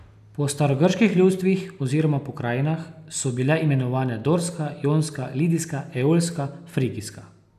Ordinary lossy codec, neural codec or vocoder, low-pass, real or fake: none; none; 14.4 kHz; real